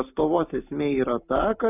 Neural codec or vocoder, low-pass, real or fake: none; 3.6 kHz; real